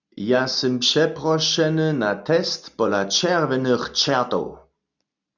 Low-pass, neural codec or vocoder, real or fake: 7.2 kHz; none; real